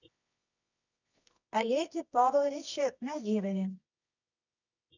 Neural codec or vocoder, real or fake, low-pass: codec, 24 kHz, 0.9 kbps, WavTokenizer, medium music audio release; fake; 7.2 kHz